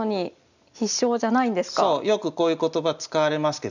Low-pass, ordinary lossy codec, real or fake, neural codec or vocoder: 7.2 kHz; none; real; none